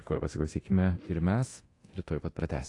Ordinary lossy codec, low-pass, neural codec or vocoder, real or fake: AAC, 48 kbps; 10.8 kHz; codec, 24 kHz, 0.9 kbps, DualCodec; fake